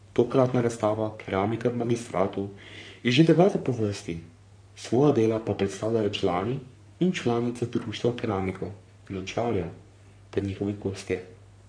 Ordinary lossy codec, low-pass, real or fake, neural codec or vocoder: none; 9.9 kHz; fake; codec, 44.1 kHz, 3.4 kbps, Pupu-Codec